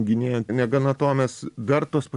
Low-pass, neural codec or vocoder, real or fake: 10.8 kHz; none; real